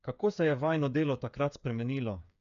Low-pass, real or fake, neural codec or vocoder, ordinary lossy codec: 7.2 kHz; fake; codec, 16 kHz, 8 kbps, FreqCodec, smaller model; none